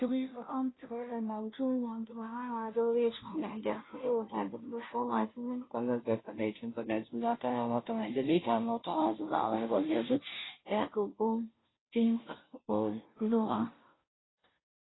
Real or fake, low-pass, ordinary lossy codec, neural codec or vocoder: fake; 7.2 kHz; AAC, 16 kbps; codec, 16 kHz, 0.5 kbps, FunCodec, trained on Chinese and English, 25 frames a second